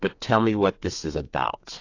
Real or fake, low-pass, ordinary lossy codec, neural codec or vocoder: fake; 7.2 kHz; AAC, 48 kbps; codec, 32 kHz, 1.9 kbps, SNAC